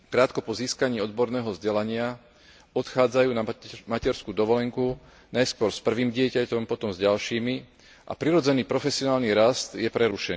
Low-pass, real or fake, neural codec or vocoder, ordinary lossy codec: none; real; none; none